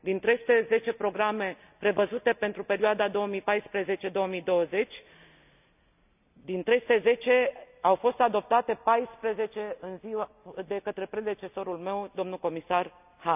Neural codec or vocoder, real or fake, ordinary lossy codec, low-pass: none; real; none; 3.6 kHz